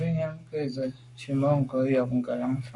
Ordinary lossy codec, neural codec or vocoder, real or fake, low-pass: MP3, 96 kbps; codec, 44.1 kHz, 7.8 kbps, Pupu-Codec; fake; 10.8 kHz